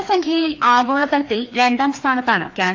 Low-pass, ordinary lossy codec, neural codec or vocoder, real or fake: 7.2 kHz; AAC, 48 kbps; codec, 16 kHz, 2 kbps, FreqCodec, larger model; fake